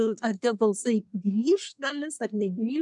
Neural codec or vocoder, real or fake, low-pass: codec, 24 kHz, 1 kbps, SNAC; fake; 10.8 kHz